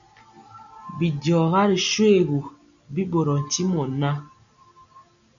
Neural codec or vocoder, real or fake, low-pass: none; real; 7.2 kHz